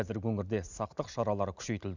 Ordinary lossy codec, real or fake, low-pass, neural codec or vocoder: none; real; 7.2 kHz; none